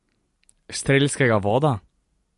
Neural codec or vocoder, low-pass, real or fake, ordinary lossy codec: none; 14.4 kHz; real; MP3, 48 kbps